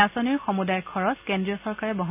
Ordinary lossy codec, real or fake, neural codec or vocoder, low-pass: none; real; none; 3.6 kHz